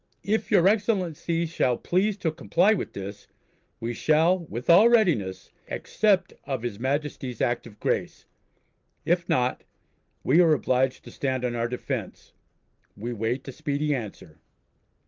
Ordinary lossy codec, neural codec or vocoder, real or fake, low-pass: Opus, 32 kbps; none; real; 7.2 kHz